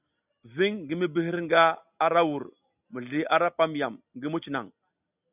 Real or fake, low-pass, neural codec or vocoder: real; 3.6 kHz; none